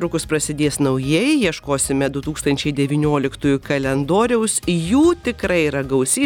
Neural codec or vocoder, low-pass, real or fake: none; 19.8 kHz; real